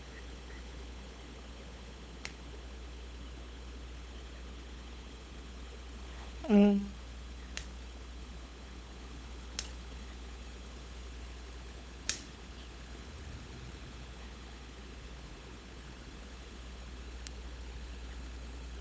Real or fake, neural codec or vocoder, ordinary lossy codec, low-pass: fake; codec, 16 kHz, 16 kbps, FunCodec, trained on LibriTTS, 50 frames a second; none; none